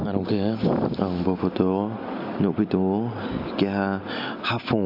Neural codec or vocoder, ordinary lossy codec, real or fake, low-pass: none; none; real; 5.4 kHz